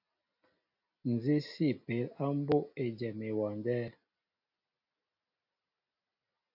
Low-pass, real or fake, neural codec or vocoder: 5.4 kHz; real; none